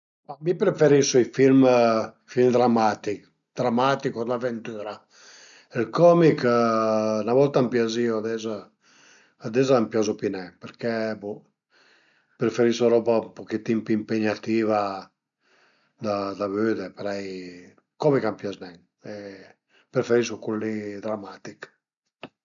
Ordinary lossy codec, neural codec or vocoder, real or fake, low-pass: none; none; real; 7.2 kHz